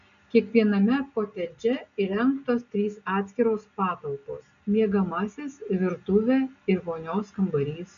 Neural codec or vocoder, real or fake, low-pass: none; real; 7.2 kHz